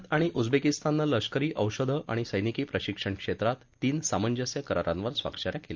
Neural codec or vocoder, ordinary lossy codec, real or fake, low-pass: none; Opus, 24 kbps; real; 7.2 kHz